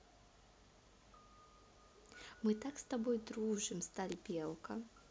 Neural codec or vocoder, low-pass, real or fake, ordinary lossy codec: none; none; real; none